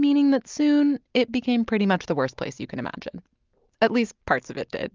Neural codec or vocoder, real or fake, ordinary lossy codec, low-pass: none; real; Opus, 24 kbps; 7.2 kHz